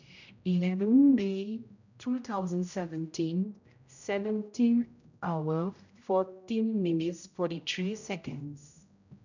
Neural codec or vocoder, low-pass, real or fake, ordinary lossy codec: codec, 16 kHz, 0.5 kbps, X-Codec, HuBERT features, trained on general audio; 7.2 kHz; fake; none